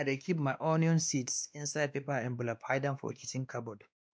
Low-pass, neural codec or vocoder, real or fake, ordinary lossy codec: none; codec, 16 kHz, 2 kbps, X-Codec, WavLM features, trained on Multilingual LibriSpeech; fake; none